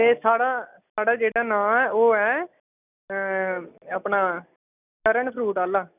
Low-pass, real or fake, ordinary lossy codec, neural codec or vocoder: 3.6 kHz; real; none; none